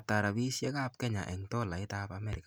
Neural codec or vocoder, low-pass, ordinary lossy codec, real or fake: none; none; none; real